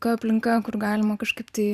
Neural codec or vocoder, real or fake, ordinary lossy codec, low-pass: vocoder, 44.1 kHz, 128 mel bands every 512 samples, BigVGAN v2; fake; Opus, 64 kbps; 14.4 kHz